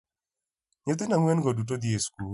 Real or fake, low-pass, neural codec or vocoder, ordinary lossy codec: real; 10.8 kHz; none; none